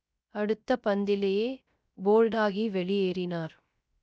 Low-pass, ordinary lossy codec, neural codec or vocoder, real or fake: none; none; codec, 16 kHz, 0.3 kbps, FocalCodec; fake